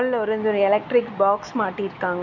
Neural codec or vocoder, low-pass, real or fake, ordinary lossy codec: none; 7.2 kHz; real; none